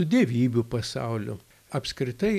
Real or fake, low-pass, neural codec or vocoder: real; 14.4 kHz; none